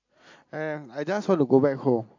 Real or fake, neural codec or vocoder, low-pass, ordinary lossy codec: real; none; 7.2 kHz; AAC, 48 kbps